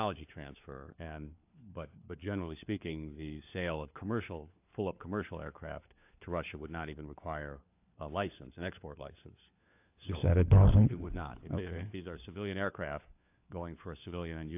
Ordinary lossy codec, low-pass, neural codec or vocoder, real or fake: AAC, 32 kbps; 3.6 kHz; codec, 16 kHz, 4 kbps, FunCodec, trained on LibriTTS, 50 frames a second; fake